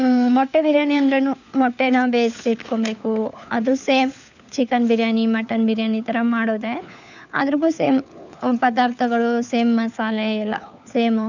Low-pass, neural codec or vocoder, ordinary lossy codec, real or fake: 7.2 kHz; codec, 16 kHz, 4 kbps, FunCodec, trained on LibriTTS, 50 frames a second; none; fake